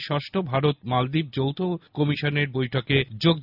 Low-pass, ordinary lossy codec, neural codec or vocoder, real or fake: 5.4 kHz; none; none; real